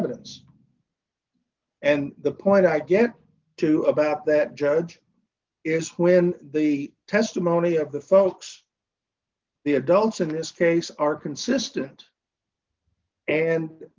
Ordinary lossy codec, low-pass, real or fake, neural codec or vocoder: Opus, 24 kbps; 7.2 kHz; real; none